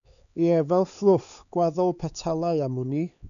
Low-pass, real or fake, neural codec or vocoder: 7.2 kHz; fake; codec, 16 kHz, 4 kbps, X-Codec, HuBERT features, trained on LibriSpeech